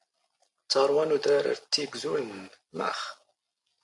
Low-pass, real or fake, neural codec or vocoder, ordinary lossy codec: 10.8 kHz; real; none; AAC, 64 kbps